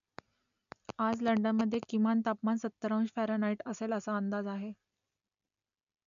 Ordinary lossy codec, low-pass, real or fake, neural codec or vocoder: none; 7.2 kHz; real; none